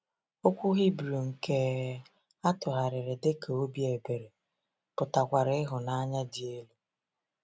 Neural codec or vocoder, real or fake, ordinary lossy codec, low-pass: none; real; none; none